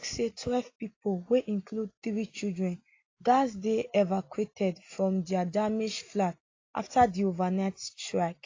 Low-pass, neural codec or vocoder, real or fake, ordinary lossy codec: 7.2 kHz; none; real; AAC, 32 kbps